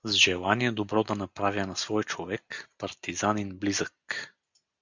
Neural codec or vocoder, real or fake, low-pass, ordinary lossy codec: none; real; 7.2 kHz; Opus, 64 kbps